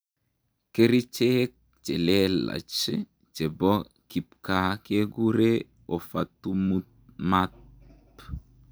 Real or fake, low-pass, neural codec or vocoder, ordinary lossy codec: real; none; none; none